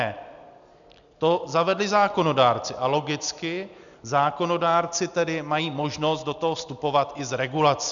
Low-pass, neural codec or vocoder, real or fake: 7.2 kHz; none; real